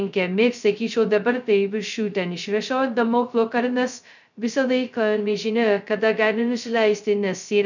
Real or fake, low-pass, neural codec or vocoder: fake; 7.2 kHz; codec, 16 kHz, 0.2 kbps, FocalCodec